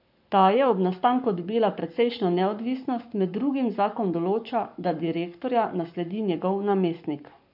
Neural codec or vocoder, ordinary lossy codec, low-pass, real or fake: codec, 44.1 kHz, 7.8 kbps, Pupu-Codec; none; 5.4 kHz; fake